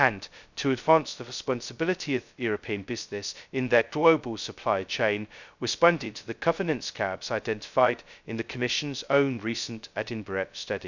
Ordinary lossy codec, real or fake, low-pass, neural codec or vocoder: none; fake; 7.2 kHz; codec, 16 kHz, 0.2 kbps, FocalCodec